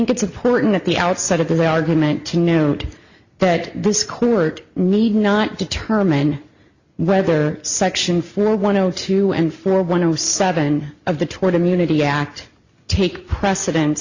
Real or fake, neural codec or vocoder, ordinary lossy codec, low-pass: real; none; Opus, 64 kbps; 7.2 kHz